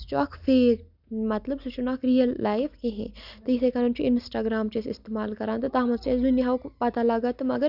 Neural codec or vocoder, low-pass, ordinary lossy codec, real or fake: none; 5.4 kHz; none; real